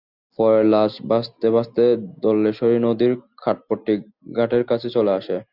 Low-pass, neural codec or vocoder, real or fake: 5.4 kHz; none; real